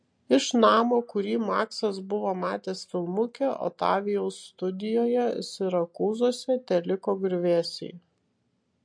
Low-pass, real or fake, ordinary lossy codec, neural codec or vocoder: 9.9 kHz; real; MP3, 48 kbps; none